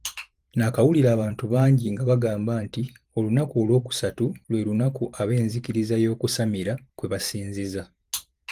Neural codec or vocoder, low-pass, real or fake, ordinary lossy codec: vocoder, 44.1 kHz, 128 mel bands every 512 samples, BigVGAN v2; 14.4 kHz; fake; Opus, 24 kbps